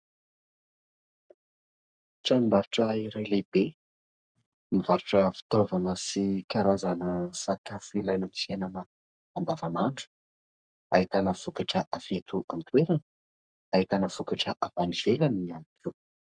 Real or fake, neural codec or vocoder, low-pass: fake; codec, 44.1 kHz, 3.4 kbps, Pupu-Codec; 9.9 kHz